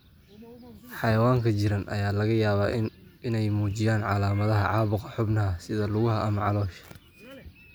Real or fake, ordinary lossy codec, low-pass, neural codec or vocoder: real; none; none; none